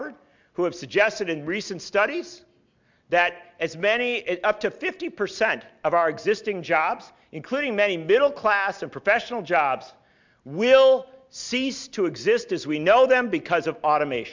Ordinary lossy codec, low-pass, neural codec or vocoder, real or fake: MP3, 64 kbps; 7.2 kHz; none; real